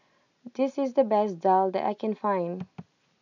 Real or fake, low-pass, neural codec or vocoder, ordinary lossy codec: real; 7.2 kHz; none; none